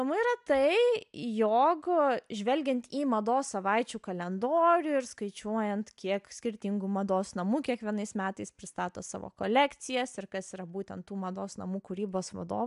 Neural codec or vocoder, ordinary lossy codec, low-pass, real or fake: none; AAC, 96 kbps; 10.8 kHz; real